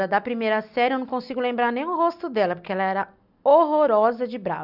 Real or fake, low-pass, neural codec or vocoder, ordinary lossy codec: real; 5.4 kHz; none; none